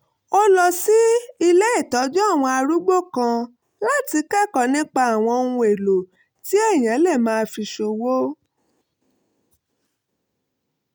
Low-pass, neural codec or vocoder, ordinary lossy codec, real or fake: none; none; none; real